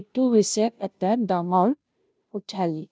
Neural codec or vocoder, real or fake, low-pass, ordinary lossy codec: codec, 16 kHz, 0.5 kbps, FunCodec, trained on Chinese and English, 25 frames a second; fake; none; none